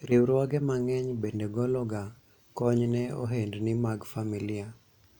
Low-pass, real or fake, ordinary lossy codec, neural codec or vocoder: 19.8 kHz; real; none; none